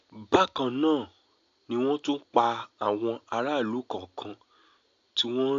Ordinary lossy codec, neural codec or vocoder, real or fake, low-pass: AAC, 48 kbps; none; real; 7.2 kHz